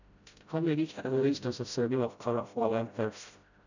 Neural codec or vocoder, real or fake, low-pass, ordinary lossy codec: codec, 16 kHz, 0.5 kbps, FreqCodec, smaller model; fake; 7.2 kHz; none